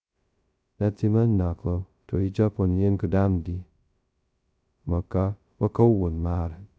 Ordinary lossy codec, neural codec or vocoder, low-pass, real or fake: none; codec, 16 kHz, 0.2 kbps, FocalCodec; none; fake